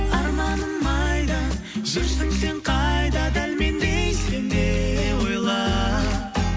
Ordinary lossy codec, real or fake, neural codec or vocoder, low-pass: none; real; none; none